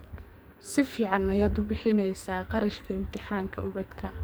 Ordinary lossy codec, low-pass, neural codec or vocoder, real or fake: none; none; codec, 44.1 kHz, 2.6 kbps, SNAC; fake